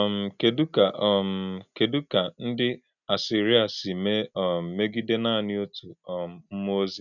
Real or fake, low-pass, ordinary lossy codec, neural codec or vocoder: real; 7.2 kHz; none; none